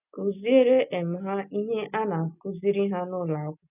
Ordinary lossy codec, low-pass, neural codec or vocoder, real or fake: none; 3.6 kHz; none; real